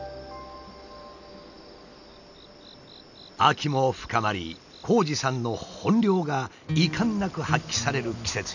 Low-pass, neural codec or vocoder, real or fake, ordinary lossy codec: 7.2 kHz; none; real; none